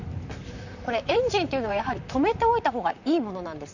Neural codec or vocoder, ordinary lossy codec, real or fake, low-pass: vocoder, 44.1 kHz, 128 mel bands, Pupu-Vocoder; none; fake; 7.2 kHz